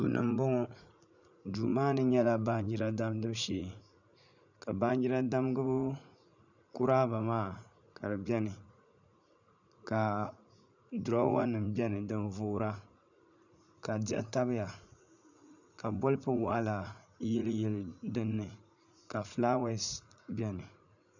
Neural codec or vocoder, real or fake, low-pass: vocoder, 44.1 kHz, 80 mel bands, Vocos; fake; 7.2 kHz